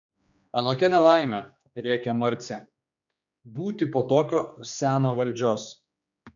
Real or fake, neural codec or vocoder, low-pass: fake; codec, 16 kHz, 2 kbps, X-Codec, HuBERT features, trained on general audio; 7.2 kHz